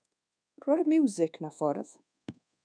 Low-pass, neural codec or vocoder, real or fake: 9.9 kHz; codec, 24 kHz, 1.2 kbps, DualCodec; fake